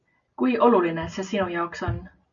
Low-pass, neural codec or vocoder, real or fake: 7.2 kHz; none; real